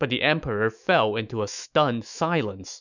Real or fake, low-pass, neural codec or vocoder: fake; 7.2 kHz; autoencoder, 48 kHz, 128 numbers a frame, DAC-VAE, trained on Japanese speech